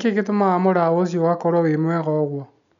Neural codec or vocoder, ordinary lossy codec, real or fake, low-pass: none; none; real; 7.2 kHz